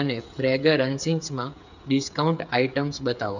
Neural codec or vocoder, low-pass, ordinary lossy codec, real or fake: codec, 16 kHz, 8 kbps, FreqCodec, smaller model; 7.2 kHz; none; fake